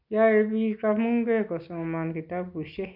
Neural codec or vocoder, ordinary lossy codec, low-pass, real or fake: none; MP3, 48 kbps; 5.4 kHz; real